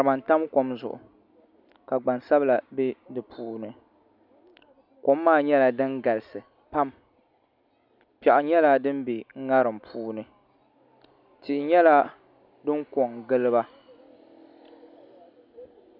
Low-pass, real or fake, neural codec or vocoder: 5.4 kHz; real; none